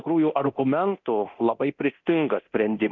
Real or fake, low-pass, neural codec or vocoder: fake; 7.2 kHz; codec, 24 kHz, 0.9 kbps, DualCodec